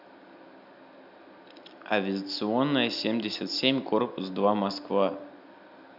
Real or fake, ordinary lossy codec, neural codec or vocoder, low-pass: real; none; none; 5.4 kHz